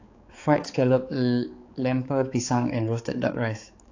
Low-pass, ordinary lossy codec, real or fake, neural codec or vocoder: 7.2 kHz; AAC, 48 kbps; fake; codec, 16 kHz, 4 kbps, X-Codec, HuBERT features, trained on balanced general audio